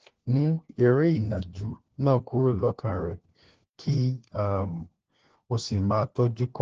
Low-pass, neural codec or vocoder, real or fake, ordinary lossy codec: 7.2 kHz; codec, 16 kHz, 1 kbps, FunCodec, trained on LibriTTS, 50 frames a second; fake; Opus, 16 kbps